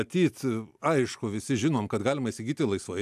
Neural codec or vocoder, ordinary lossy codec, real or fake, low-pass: none; AAC, 96 kbps; real; 14.4 kHz